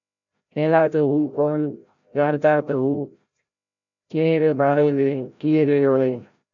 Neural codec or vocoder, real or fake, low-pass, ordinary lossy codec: codec, 16 kHz, 0.5 kbps, FreqCodec, larger model; fake; 7.2 kHz; MP3, 96 kbps